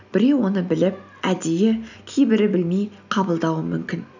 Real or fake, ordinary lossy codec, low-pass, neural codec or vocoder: real; none; 7.2 kHz; none